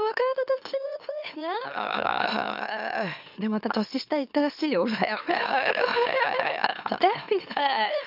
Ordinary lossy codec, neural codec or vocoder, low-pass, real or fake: none; autoencoder, 44.1 kHz, a latent of 192 numbers a frame, MeloTTS; 5.4 kHz; fake